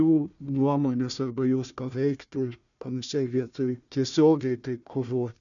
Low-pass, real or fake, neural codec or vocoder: 7.2 kHz; fake; codec, 16 kHz, 1 kbps, FunCodec, trained on Chinese and English, 50 frames a second